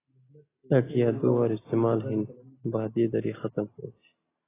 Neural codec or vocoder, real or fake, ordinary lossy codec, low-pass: none; real; AAC, 16 kbps; 3.6 kHz